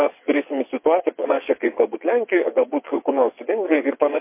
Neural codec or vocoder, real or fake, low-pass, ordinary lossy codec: vocoder, 22.05 kHz, 80 mel bands, WaveNeXt; fake; 3.6 kHz; MP3, 24 kbps